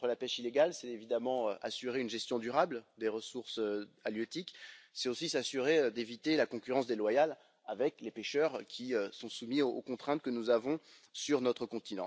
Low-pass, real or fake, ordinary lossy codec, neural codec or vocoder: none; real; none; none